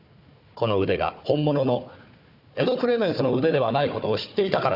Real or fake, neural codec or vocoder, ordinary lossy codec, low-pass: fake; codec, 16 kHz, 4 kbps, FunCodec, trained on Chinese and English, 50 frames a second; none; 5.4 kHz